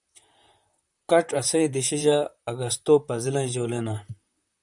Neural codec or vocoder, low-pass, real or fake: vocoder, 44.1 kHz, 128 mel bands, Pupu-Vocoder; 10.8 kHz; fake